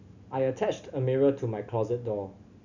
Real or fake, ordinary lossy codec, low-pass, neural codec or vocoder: real; none; 7.2 kHz; none